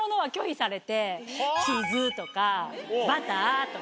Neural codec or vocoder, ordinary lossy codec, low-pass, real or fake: none; none; none; real